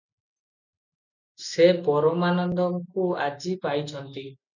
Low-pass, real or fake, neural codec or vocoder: 7.2 kHz; real; none